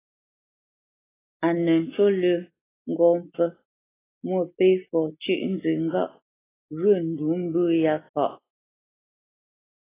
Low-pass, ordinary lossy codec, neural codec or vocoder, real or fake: 3.6 kHz; AAC, 16 kbps; none; real